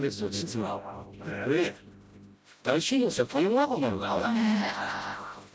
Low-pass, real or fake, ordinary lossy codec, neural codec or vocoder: none; fake; none; codec, 16 kHz, 0.5 kbps, FreqCodec, smaller model